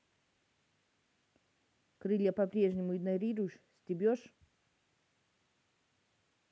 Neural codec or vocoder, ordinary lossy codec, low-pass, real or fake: none; none; none; real